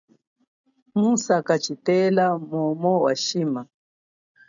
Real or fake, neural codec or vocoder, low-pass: real; none; 7.2 kHz